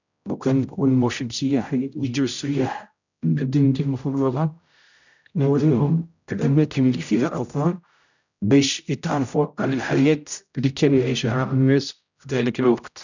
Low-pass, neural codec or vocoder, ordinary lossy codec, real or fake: 7.2 kHz; codec, 16 kHz, 0.5 kbps, X-Codec, HuBERT features, trained on general audio; none; fake